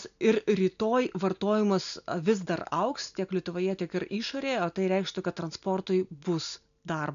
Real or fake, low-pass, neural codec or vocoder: real; 7.2 kHz; none